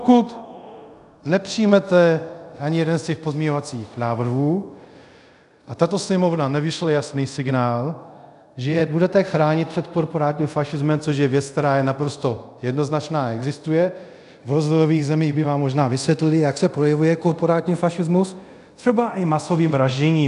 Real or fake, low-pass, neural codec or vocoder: fake; 10.8 kHz; codec, 24 kHz, 0.5 kbps, DualCodec